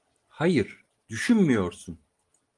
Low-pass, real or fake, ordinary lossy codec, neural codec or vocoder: 10.8 kHz; real; Opus, 24 kbps; none